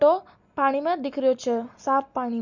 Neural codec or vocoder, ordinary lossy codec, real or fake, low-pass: none; none; real; 7.2 kHz